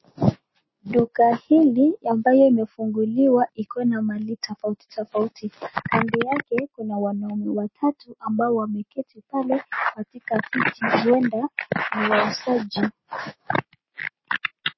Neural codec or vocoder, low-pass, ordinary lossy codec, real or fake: none; 7.2 kHz; MP3, 24 kbps; real